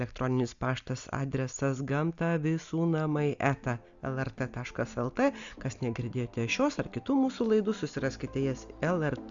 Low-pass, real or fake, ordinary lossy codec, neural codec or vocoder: 7.2 kHz; real; Opus, 64 kbps; none